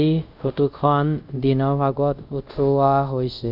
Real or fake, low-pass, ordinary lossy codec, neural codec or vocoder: fake; 5.4 kHz; none; codec, 24 kHz, 0.5 kbps, DualCodec